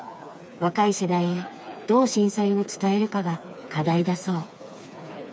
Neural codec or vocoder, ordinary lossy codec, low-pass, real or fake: codec, 16 kHz, 4 kbps, FreqCodec, smaller model; none; none; fake